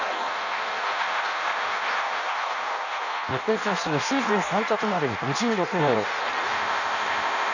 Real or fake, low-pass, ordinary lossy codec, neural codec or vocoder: fake; 7.2 kHz; none; codec, 16 kHz in and 24 kHz out, 0.6 kbps, FireRedTTS-2 codec